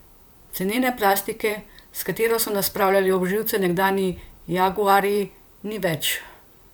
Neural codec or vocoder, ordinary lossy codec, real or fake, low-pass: vocoder, 44.1 kHz, 128 mel bands, Pupu-Vocoder; none; fake; none